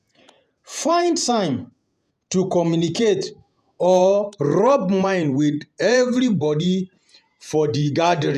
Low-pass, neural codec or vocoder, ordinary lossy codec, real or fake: 14.4 kHz; vocoder, 48 kHz, 128 mel bands, Vocos; none; fake